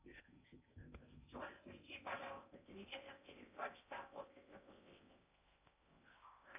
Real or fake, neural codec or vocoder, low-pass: fake; codec, 16 kHz in and 24 kHz out, 0.6 kbps, FocalCodec, streaming, 4096 codes; 3.6 kHz